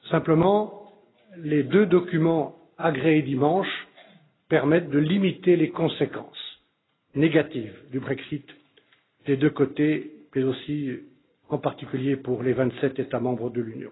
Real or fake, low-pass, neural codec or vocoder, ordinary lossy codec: real; 7.2 kHz; none; AAC, 16 kbps